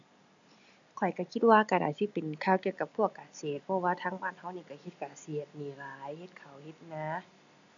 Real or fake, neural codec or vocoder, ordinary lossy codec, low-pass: real; none; none; 7.2 kHz